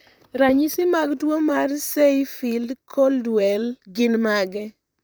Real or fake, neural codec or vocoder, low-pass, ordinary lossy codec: fake; vocoder, 44.1 kHz, 128 mel bands, Pupu-Vocoder; none; none